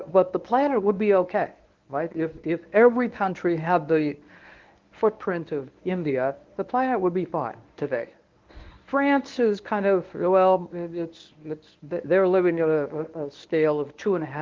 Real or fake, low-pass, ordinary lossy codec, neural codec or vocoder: fake; 7.2 kHz; Opus, 32 kbps; codec, 24 kHz, 0.9 kbps, WavTokenizer, medium speech release version 1